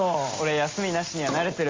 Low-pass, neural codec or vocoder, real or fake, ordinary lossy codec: 7.2 kHz; none; real; Opus, 32 kbps